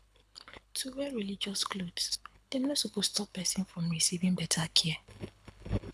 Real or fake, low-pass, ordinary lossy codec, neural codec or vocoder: fake; none; none; codec, 24 kHz, 6 kbps, HILCodec